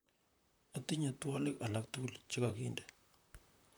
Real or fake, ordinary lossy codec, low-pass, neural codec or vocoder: fake; none; none; vocoder, 44.1 kHz, 128 mel bands, Pupu-Vocoder